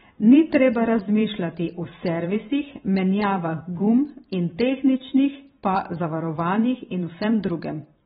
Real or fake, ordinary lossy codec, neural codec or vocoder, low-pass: fake; AAC, 16 kbps; vocoder, 44.1 kHz, 128 mel bands every 512 samples, BigVGAN v2; 19.8 kHz